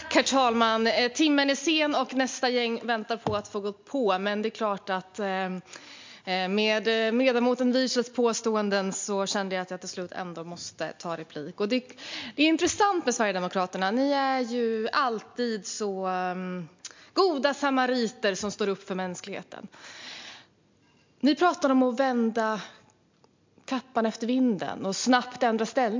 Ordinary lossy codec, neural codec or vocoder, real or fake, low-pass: MP3, 64 kbps; none; real; 7.2 kHz